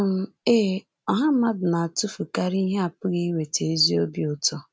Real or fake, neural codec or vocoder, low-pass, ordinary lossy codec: real; none; none; none